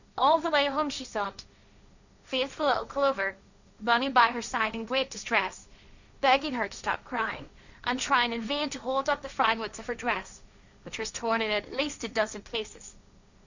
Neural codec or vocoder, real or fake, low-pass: codec, 16 kHz, 1.1 kbps, Voila-Tokenizer; fake; 7.2 kHz